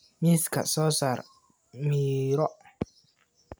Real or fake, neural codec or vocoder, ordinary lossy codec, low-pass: real; none; none; none